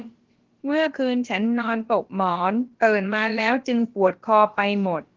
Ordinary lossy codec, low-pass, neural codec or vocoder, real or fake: Opus, 16 kbps; 7.2 kHz; codec, 16 kHz, about 1 kbps, DyCAST, with the encoder's durations; fake